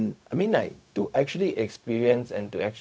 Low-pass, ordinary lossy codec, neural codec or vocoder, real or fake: none; none; codec, 16 kHz, 0.4 kbps, LongCat-Audio-Codec; fake